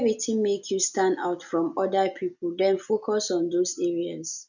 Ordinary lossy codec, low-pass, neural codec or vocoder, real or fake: none; 7.2 kHz; none; real